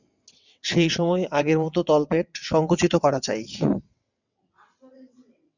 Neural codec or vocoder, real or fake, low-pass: vocoder, 22.05 kHz, 80 mel bands, WaveNeXt; fake; 7.2 kHz